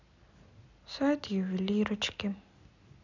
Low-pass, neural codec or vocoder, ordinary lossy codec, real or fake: 7.2 kHz; none; none; real